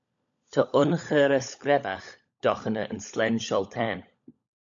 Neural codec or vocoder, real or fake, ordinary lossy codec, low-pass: codec, 16 kHz, 16 kbps, FunCodec, trained on LibriTTS, 50 frames a second; fake; MP3, 64 kbps; 7.2 kHz